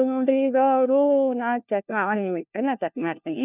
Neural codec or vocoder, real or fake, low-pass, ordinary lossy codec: codec, 16 kHz, 1 kbps, FunCodec, trained on LibriTTS, 50 frames a second; fake; 3.6 kHz; none